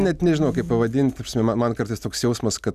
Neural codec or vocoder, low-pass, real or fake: none; 14.4 kHz; real